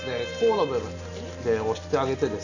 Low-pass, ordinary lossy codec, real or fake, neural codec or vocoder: 7.2 kHz; none; real; none